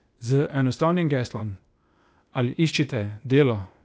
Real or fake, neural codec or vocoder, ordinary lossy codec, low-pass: fake; codec, 16 kHz, 0.8 kbps, ZipCodec; none; none